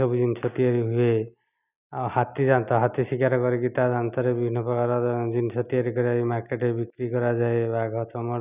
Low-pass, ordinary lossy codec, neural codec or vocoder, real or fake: 3.6 kHz; none; none; real